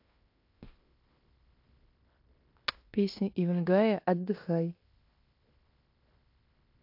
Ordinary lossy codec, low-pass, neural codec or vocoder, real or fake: AAC, 48 kbps; 5.4 kHz; codec, 16 kHz in and 24 kHz out, 0.9 kbps, LongCat-Audio-Codec, fine tuned four codebook decoder; fake